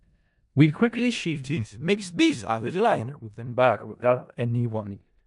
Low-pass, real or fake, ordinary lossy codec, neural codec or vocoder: 10.8 kHz; fake; none; codec, 16 kHz in and 24 kHz out, 0.4 kbps, LongCat-Audio-Codec, four codebook decoder